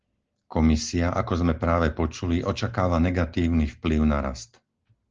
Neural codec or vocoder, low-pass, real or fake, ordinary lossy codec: none; 7.2 kHz; real; Opus, 16 kbps